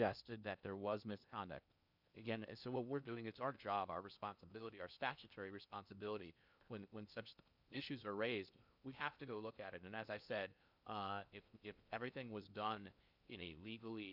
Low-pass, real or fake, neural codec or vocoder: 5.4 kHz; fake; codec, 16 kHz in and 24 kHz out, 0.8 kbps, FocalCodec, streaming, 65536 codes